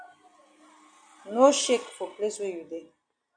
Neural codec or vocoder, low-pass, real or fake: none; 9.9 kHz; real